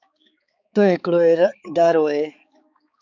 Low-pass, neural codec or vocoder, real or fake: 7.2 kHz; codec, 16 kHz, 4 kbps, X-Codec, HuBERT features, trained on balanced general audio; fake